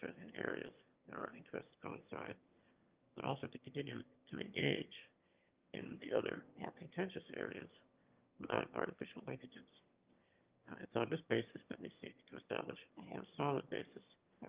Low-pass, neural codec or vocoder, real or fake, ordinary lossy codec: 3.6 kHz; autoencoder, 22.05 kHz, a latent of 192 numbers a frame, VITS, trained on one speaker; fake; Opus, 24 kbps